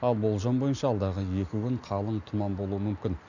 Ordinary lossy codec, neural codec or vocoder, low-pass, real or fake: none; none; 7.2 kHz; real